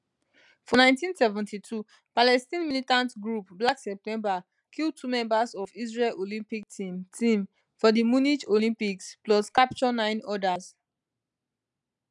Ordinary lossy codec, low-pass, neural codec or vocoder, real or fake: none; 10.8 kHz; none; real